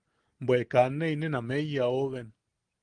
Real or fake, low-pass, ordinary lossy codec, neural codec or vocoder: real; 9.9 kHz; Opus, 24 kbps; none